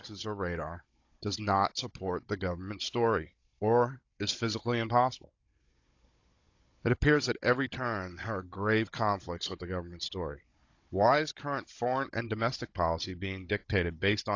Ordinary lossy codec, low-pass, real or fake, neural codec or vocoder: AAC, 48 kbps; 7.2 kHz; fake; codec, 16 kHz, 16 kbps, FunCodec, trained on Chinese and English, 50 frames a second